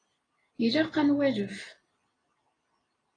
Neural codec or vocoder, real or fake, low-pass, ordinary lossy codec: none; real; 9.9 kHz; AAC, 32 kbps